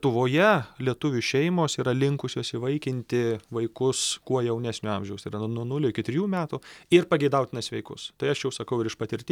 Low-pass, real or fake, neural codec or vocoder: 19.8 kHz; real; none